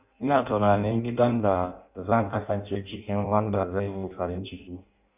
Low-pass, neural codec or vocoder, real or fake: 3.6 kHz; codec, 16 kHz in and 24 kHz out, 0.6 kbps, FireRedTTS-2 codec; fake